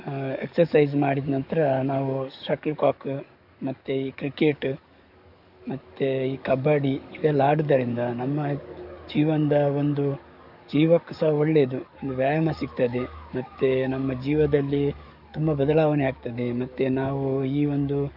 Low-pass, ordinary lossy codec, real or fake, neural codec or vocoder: 5.4 kHz; none; fake; codec, 44.1 kHz, 7.8 kbps, DAC